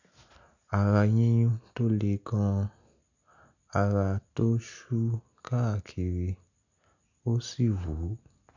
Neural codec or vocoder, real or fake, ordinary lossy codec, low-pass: none; real; none; 7.2 kHz